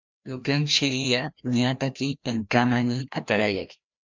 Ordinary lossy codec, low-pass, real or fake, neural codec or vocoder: MP3, 64 kbps; 7.2 kHz; fake; codec, 16 kHz, 1 kbps, FreqCodec, larger model